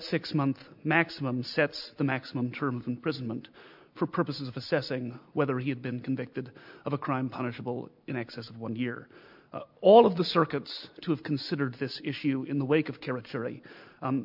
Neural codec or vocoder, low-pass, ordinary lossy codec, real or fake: none; 5.4 kHz; MP3, 48 kbps; real